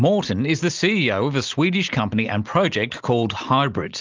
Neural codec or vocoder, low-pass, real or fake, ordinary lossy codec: none; 7.2 kHz; real; Opus, 32 kbps